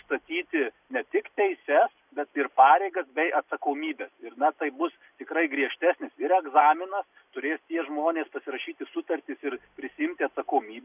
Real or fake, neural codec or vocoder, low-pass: real; none; 3.6 kHz